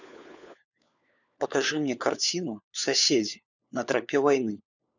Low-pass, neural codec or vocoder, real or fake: 7.2 kHz; codec, 16 kHz, 4 kbps, FunCodec, trained on LibriTTS, 50 frames a second; fake